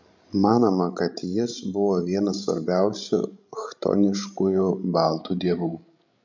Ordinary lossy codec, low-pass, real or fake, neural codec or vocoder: MP3, 64 kbps; 7.2 kHz; fake; codec, 16 kHz, 16 kbps, FreqCodec, larger model